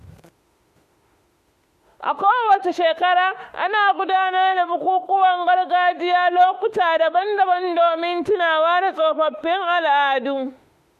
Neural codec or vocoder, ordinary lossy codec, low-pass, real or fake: autoencoder, 48 kHz, 32 numbers a frame, DAC-VAE, trained on Japanese speech; MP3, 64 kbps; 14.4 kHz; fake